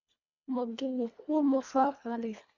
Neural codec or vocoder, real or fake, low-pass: codec, 24 kHz, 1.5 kbps, HILCodec; fake; 7.2 kHz